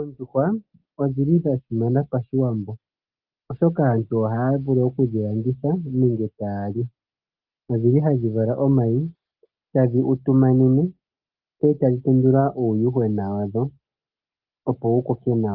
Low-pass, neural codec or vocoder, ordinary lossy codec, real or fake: 5.4 kHz; none; Opus, 32 kbps; real